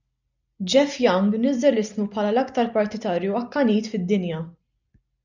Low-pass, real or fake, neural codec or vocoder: 7.2 kHz; real; none